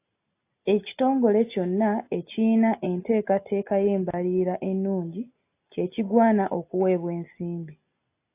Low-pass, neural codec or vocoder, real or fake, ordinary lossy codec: 3.6 kHz; none; real; AAC, 24 kbps